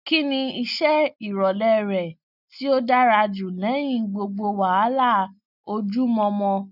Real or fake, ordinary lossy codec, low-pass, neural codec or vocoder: real; none; 5.4 kHz; none